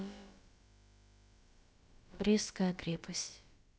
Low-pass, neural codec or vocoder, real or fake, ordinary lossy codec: none; codec, 16 kHz, about 1 kbps, DyCAST, with the encoder's durations; fake; none